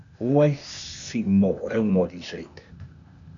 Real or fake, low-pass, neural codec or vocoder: fake; 7.2 kHz; codec, 16 kHz, 0.8 kbps, ZipCodec